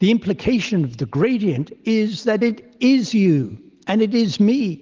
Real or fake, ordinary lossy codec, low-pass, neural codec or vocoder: real; Opus, 16 kbps; 7.2 kHz; none